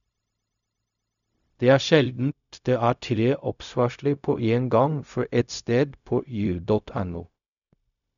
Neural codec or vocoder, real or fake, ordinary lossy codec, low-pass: codec, 16 kHz, 0.4 kbps, LongCat-Audio-Codec; fake; none; 7.2 kHz